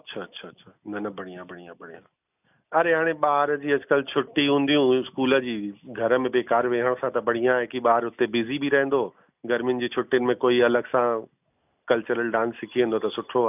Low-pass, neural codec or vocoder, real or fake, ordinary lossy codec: 3.6 kHz; none; real; none